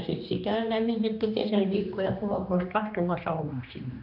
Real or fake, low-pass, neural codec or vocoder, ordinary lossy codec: fake; 5.4 kHz; codec, 16 kHz, 2 kbps, X-Codec, HuBERT features, trained on general audio; none